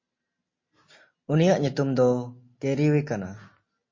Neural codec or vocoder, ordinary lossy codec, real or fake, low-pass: none; MP3, 32 kbps; real; 7.2 kHz